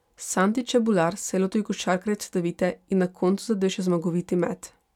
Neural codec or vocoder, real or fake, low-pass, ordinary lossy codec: none; real; 19.8 kHz; none